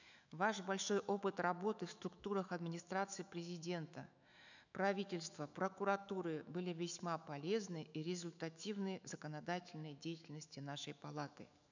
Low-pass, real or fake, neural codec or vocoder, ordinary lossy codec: 7.2 kHz; fake; autoencoder, 48 kHz, 128 numbers a frame, DAC-VAE, trained on Japanese speech; none